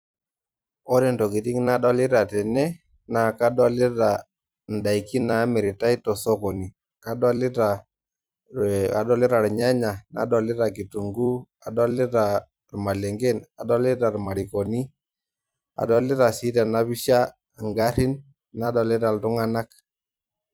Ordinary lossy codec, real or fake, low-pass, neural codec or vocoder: none; fake; none; vocoder, 44.1 kHz, 128 mel bands every 256 samples, BigVGAN v2